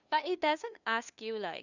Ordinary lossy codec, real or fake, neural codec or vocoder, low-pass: none; fake; codec, 16 kHz, 2 kbps, FunCodec, trained on LibriTTS, 25 frames a second; 7.2 kHz